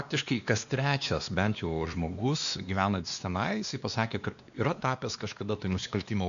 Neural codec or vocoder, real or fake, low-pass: codec, 16 kHz, 2 kbps, X-Codec, WavLM features, trained on Multilingual LibriSpeech; fake; 7.2 kHz